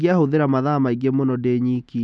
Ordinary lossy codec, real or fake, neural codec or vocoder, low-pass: none; real; none; none